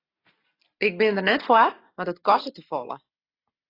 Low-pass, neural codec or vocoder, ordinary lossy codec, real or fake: 5.4 kHz; none; AAC, 24 kbps; real